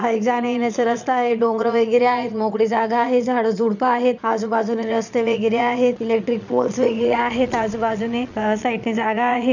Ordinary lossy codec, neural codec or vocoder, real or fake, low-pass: none; vocoder, 22.05 kHz, 80 mel bands, Vocos; fake; 7.2 kHz